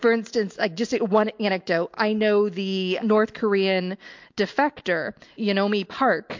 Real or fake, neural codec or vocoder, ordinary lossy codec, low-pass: real; none; MP3, 48 kbps; 7.2 kHz